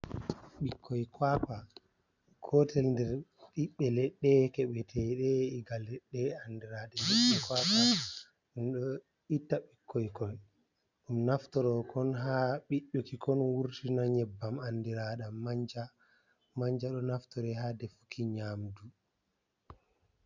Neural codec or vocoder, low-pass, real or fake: none; 7.2 kHz; real